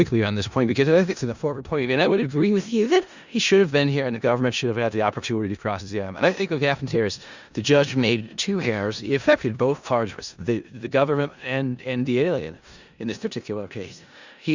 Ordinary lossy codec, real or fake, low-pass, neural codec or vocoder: Opus, 64 kbps; fake; 7.2 kHz; codec, 16 kHz in and 24 kHz out, 0.4 kbps, LongCat-Audio-Codec, four codebook decoder